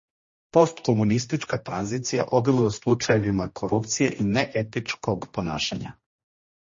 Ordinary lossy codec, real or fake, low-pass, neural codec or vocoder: MP3, 32 kbps; fake; 7.2 kHz; codec, 16 kHz, 1 kbps, X-Codec, HuBERT features, trained on general audio